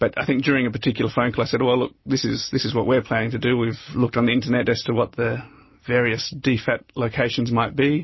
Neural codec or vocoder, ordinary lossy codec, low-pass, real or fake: none; MP3, 24 kbps; 7.2 kHz; real